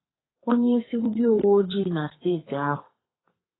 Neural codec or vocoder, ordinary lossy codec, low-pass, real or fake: codec, 32 kHz, 1.9 kbps, SNAC; AAC, 16 kbps; 7.2 kHz; fake